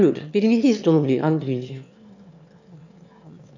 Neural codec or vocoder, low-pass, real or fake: autoencoder, 22.05 kHz, a latent of 192 numbers a frame, VITS, trained on one speaker; 7.2 kHz; fake